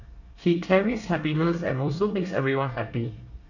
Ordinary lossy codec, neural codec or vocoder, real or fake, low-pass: none; codec, 24 kHz, 1 kbps, SNAC; fake; 7.2 kHz